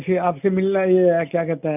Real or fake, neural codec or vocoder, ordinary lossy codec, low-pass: real; none; none; 3.6 kHz